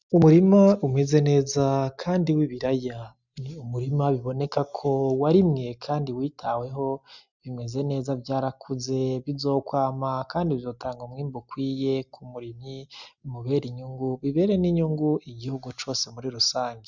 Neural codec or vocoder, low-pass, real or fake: none; 7.2 kHz; real